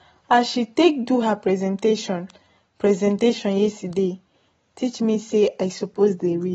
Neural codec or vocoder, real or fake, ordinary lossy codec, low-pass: none; real; AAC, 24 kbps; 19.8 kHz